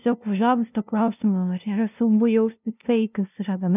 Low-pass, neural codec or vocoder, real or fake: 3.6 kHz; codec, 16 kHz, 0.5 kbps, FunCodec, trained on LibriTTS, 25 frames a second; fake